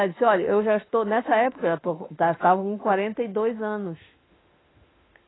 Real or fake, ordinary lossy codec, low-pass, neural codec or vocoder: fake; AAC, 16 kbps; 7.2 kHz; autoencoder, 48 kHz, 32 numbers a frame, DAC-VAE, trained on Japanese speech